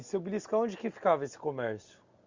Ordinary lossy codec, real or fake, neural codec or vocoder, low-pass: Opus, 64 kbps; real; none; 7.2 kHz